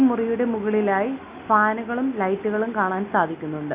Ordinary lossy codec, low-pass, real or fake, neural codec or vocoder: Opus, 64 kbps; 3.6 kHz; real; none